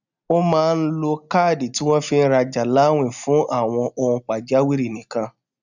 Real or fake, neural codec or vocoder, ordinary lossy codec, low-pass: real; none; none; 7.2 kHz